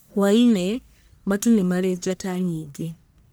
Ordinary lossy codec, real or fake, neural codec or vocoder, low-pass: none; fake; codec, 44.1 kHz, 1.7 kbps, Pupu-Codec; none